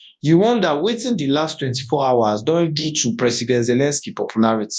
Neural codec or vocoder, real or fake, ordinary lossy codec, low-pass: codec, 24 kHz, 0.9 kbps, WavTokenizer, large speech release; fake; none; 10.8 kHz